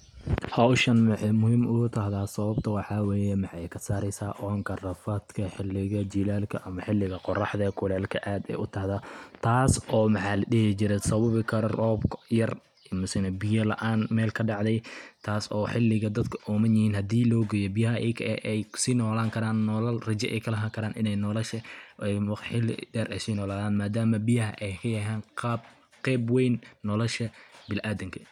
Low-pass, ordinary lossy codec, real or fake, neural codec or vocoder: 19.8 kHz; none; real; none